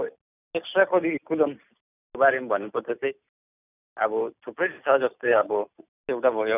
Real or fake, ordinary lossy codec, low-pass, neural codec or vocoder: real; none; 3.6 kHz; none